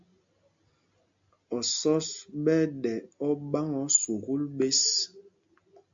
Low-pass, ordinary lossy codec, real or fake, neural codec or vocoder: 7.2 kHz; MP3, 64 kbps; real; none